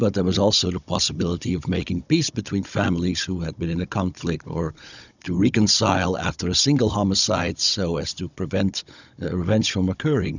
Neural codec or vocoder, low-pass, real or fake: codec, 16 kHz, 16 kbps, FunCodec, trained on Chinese and English, 50 frames a second; 7.2 kHz; fake